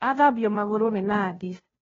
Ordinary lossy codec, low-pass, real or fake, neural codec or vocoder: AAC, 24 kbps; 7.2 kHz; fake; codec, 16 kHz, 0.5 kbps, FunCodec, trained on Chinese and English, 25 frames a second